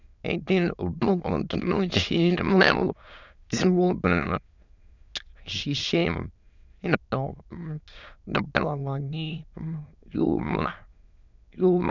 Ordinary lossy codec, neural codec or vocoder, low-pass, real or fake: none; autoencoder, 22.05 kHz, a latent of 192 numbers a frame, VITS, trained on many speakers; 7.2 kHz; fake